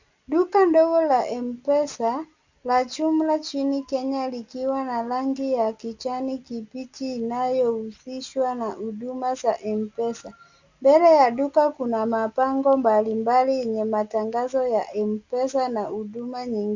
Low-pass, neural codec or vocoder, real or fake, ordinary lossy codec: 7.2 kHz; none; real; Opus, 64 kbps